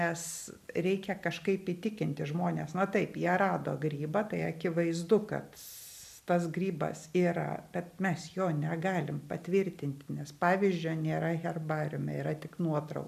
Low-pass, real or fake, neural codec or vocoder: 14.4 kHz; real; none